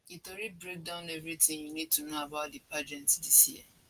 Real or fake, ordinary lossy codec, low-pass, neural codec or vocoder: real; Opus, 32 kbps; 14.4 kHz; none